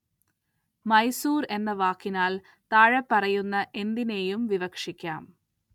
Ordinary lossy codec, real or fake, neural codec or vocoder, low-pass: none; real; none; 19.8 kHz